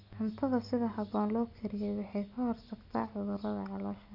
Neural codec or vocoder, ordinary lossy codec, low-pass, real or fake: none; none; 5.4 kHz; real